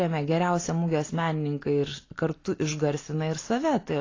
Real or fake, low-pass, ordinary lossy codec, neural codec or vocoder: real; 7.2 kHz; AAC, 32 kbps; none